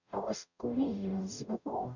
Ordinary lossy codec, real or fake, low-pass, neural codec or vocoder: none; fake; 7.2 kHz; codec, 44.1 kHz, 0.9 kbps, DAC